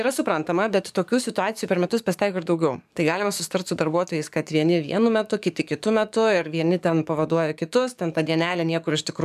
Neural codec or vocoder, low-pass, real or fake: codec, 44.1 kHz, 7.8 kbps, DAC; 14.4 kHz; fake